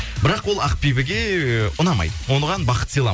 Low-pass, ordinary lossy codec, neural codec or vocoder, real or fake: none; none; none; real